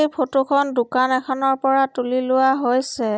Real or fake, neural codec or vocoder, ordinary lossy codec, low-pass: real; none; none; none